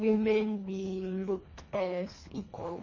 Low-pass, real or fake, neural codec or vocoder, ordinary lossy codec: 7.2 kHz; fake; codec, 24 kHz, 1.5 kbps, HILCodec; MP3, 32 kbps